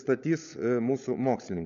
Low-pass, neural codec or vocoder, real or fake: 7.2 kHz; codec, 16 kHz, 16 kbps, FunCodec, trained on LibriTTS, 50 frames a second; fake